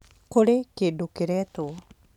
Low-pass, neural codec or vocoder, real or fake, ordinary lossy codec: 19.8 kHz; vocoder, 44.1 kHz, 128 mel bands every 512 samples, BigVGAN v2; fake; none